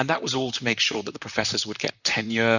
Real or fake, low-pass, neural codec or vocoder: fake; 7.2 kHz; vocoder, 44.1 kHz, 128 mel bands, Pupu-Vocoder